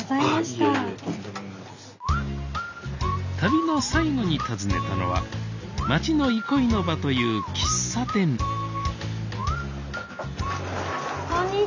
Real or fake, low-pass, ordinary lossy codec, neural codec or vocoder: real; 7.2 kHz; none; none